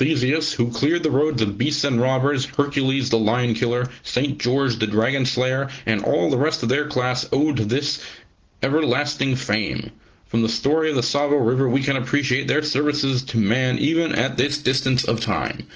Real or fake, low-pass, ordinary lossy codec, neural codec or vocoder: real; 7.2 kHz; Opus, 16 kbps; none